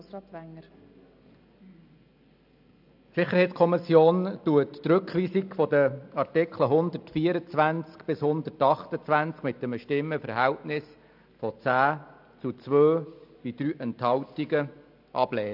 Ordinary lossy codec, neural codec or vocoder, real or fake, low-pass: AAC, 48 kbps; none; real; 5.4 kHz